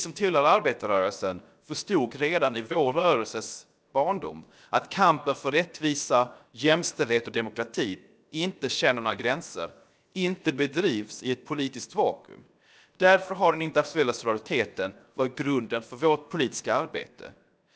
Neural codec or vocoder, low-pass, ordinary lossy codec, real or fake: codec, 16 kHz, 0.7 kbps, FocalCodec; none; none; fake